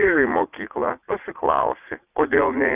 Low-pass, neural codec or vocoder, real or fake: 3.6 kHz; vocoder, 44.1 kHz, 80 mel bands, Vocos; fake